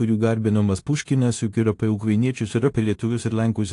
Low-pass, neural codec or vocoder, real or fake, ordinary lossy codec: 10.8 kHz; codec, 16 kHz in and 24 kHz out, 0.9 kbps, LongCat-Audio-Codec, four codebook decoder; fake; AAC, 48 kbps